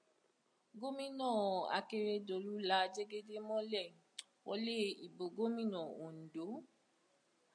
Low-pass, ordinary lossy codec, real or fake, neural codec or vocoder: 9.9 kHz; MP3, 96 kbps; real; none